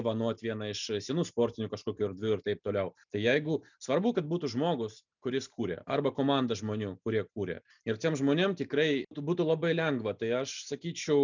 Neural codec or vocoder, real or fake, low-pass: none; real; 7.2 kHz